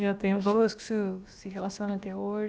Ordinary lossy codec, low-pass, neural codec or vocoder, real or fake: none; none; codec, 16 kHz, about 1 kbps, DyCAST, with the encoder's durations; fake